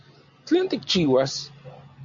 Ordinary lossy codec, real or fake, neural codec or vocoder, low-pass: MP3, 48 kbps; real; none; 7.2 kHz